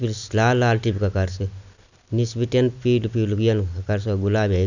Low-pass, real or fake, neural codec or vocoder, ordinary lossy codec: 7.2 kHz; real; none; none